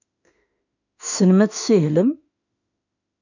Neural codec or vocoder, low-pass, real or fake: autoencoder, 48 kHz, 32 numbers a frame, DAC-VAE, trained on Japanese speech; 7.2 kHz; fake